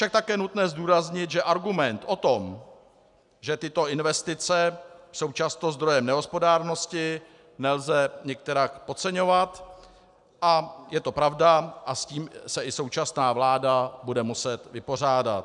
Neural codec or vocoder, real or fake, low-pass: none; real; 10.8 kHz